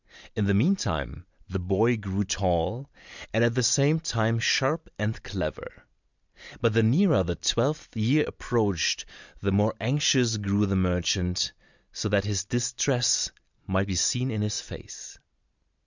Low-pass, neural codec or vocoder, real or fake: 7.2 kHz; none; real